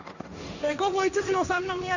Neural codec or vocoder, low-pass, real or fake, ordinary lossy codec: codec, 16 kHz, 1.1 kbps, Voila-Tokenizer; 7.2 kHz; fake; none